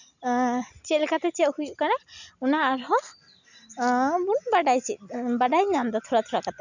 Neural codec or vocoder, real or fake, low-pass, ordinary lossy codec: none; real; 7.2 kHz; none